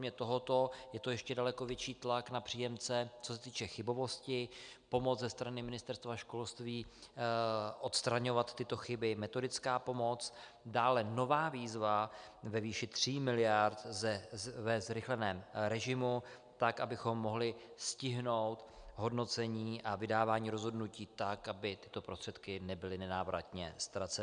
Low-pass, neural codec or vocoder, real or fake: 9.9 kHz; none; real